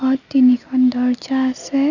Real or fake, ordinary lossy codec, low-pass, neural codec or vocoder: real; none; 7.2 kHz; none